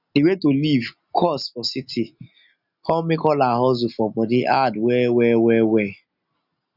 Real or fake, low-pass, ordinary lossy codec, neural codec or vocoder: real; 5.4 kHz; none; none